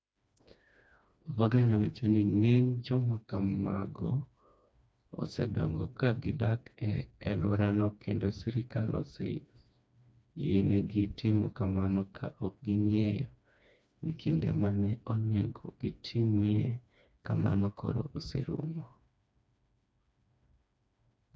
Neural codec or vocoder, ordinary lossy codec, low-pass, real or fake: codec, 16 kHz, 2 kbps, FreqCodec, smaller model; none; none; fake